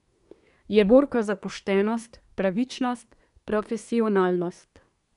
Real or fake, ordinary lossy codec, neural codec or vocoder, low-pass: fake; none; codec, 24 kHz, 1 kbps, SNAC; 10.8 kHz